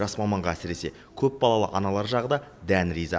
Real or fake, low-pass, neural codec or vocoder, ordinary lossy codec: real; none; none; none